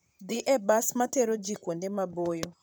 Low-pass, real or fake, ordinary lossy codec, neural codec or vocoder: none; fake; none; vocoder, 44.1 kHz, 128 mel bands, Pupu-Vocoder